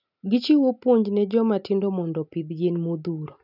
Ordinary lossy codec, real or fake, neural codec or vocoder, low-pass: none; real; none; 5.4 kHz